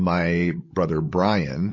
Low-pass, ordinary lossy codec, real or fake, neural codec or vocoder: 7.2 kHz; MP3, 32 kbps; fake; codec, 16 kHz, 16 kbps, FreqCodec, larger model